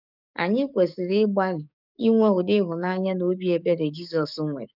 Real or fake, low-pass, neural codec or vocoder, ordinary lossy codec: fake; 5.4 kHz; codec, 44.1 kHz, 7.8 kbps, DAC; none